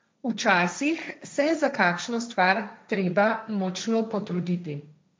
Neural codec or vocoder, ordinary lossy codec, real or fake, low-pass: codec, 16 kHz, 1.1 kbps, Voila-Tokenizer; none; fake; none